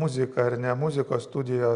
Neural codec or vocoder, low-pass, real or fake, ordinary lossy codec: none; 9.9 kHz; real; Opus, 64 kbps